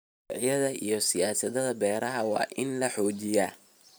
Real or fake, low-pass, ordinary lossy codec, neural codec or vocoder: fake; none; none; vocoder, 44.1 kHz, 128 mel bands every 512 samples, BigVGAN v2